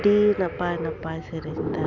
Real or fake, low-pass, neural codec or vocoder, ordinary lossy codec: real; 7.2 kHz; none; none